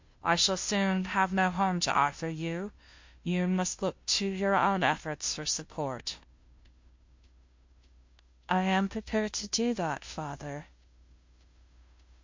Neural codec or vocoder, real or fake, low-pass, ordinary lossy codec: codec, 16 kHz, 0.5 kbps, FunCodec, trained on Chinese and English, 25 frames a second; fake; 7.2 kHz; MP3, 48 kbps